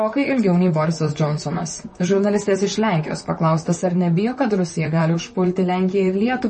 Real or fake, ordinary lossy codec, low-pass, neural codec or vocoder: fake; MP3, 32 kbps; 10.8 kHz; vocoder, 44.1 kHz, 128 mel bands, Pupu-Vocoder